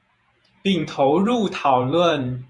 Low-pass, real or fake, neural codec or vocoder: 10.8 kHz; fake; vocoder, 48 kHz, 128 mel bands, Vocos